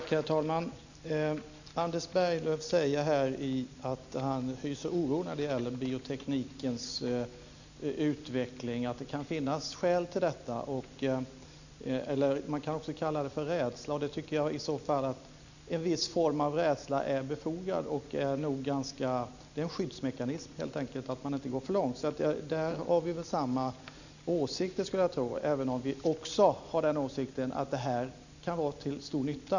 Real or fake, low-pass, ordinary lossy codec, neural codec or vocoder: real; 7.2 kHz; none; none